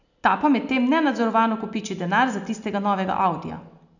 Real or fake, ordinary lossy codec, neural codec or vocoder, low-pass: real; none; none; 7.2 kHz